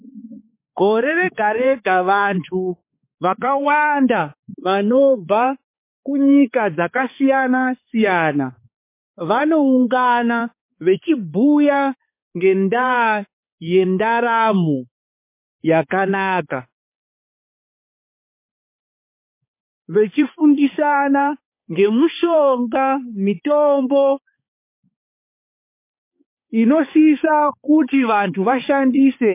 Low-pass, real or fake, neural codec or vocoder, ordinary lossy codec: 3.6 kHz; fake; codec, 16 kHz, 4 kbps, X-Codec, HuBERT features, trained on balanced general audio; MP3, 24 kbps